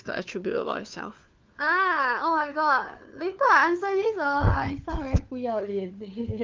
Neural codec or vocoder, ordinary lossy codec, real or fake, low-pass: codec, 16 kHz, 4 kbps, FunCodec, trained on LibriTTS, 50 frames a second; Opus, 24 kbps; fake; 7.2 kHz